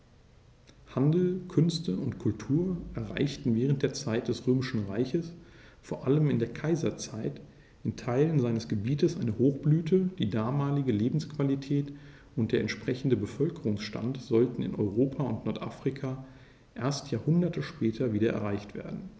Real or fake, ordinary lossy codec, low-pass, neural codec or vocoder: real; none; none; none